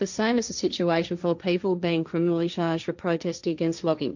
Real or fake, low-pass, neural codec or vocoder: fake; 7.2 kHz; codec, 16 kHz, 1.1 kbps, Voila-Tokenizer